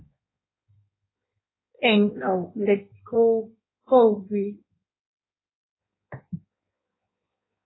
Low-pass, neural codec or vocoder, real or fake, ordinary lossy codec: 7.2 kHz; codec, 16 kHz in and 24 kHz out, 1.1 kbps, FireRedTTS-2 codec; fake; AAC, 16 kbps